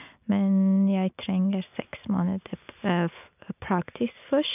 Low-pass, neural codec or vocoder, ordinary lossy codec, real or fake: 3.6 kHz; none; none; real